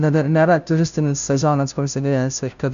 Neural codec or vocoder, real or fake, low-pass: codec, 16 kHz, 0.5 kbps, FunCodec, trained on Chinese and English, 25 frames a second; fake; 7.2 kHz